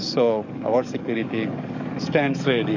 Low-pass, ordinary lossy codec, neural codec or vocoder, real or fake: 7.2 kHz; MP3, 48 kbps; codec, 44.1 kHz, 7.8 kbps, DAC; fake